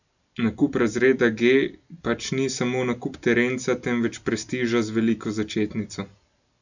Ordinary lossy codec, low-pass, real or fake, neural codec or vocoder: none; 7.2 kHz; real; none